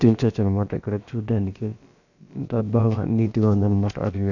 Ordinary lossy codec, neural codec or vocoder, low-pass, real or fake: none; codec, 16 kHz, about 1 kbps, DyCAST, with the encoder's durations; 7.2 kHz; fake